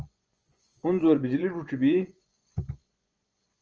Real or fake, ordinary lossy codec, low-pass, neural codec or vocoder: fake; Opus, 24 kbps; 7.2 kHz; vocoder, 24 kHz, 100 mel bands, Vocos